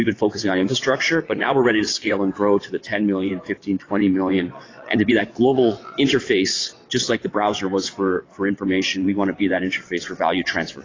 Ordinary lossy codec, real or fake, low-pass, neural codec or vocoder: AAC, 32 kbps; fake; 7.2 kHz; vocoder, 22.05 kHz, 80 mel bands, WaveNeXt